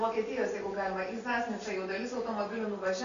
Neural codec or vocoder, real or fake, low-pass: none; real; 7.2 kHz